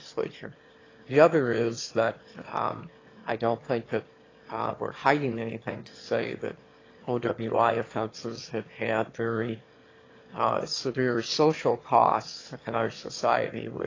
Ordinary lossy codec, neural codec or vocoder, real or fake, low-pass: AAC, 32 kbps; autoencoder, 22.05 kHz, a latent of 192 numbers a frame, VITS, trained on one speaker; fake; 7.2 kHz